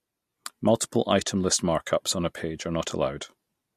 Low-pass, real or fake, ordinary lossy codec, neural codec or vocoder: 14.4 kHz; real; MP3, 64 kbps; none